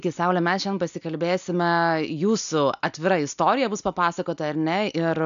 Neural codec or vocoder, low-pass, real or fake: none; 7.2 kHz; real